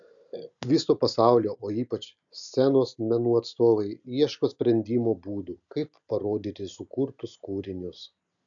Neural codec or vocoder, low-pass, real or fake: none; 7.2 kHz; real